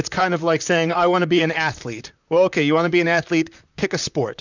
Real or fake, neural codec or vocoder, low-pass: fake; vocoder, 44.1 kHz, 128 mel bands, Pupu-Vocoder; 7.2 kHz